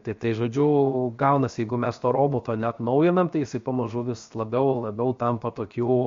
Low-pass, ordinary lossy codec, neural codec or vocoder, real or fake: 7.2 kHz; MP3, 48 kbps; codec, 16 kHz, 0.7 kbps, FocalCodec; fake